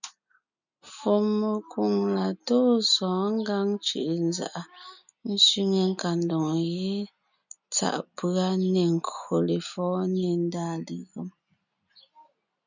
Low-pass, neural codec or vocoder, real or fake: 7.2 kHz; none; real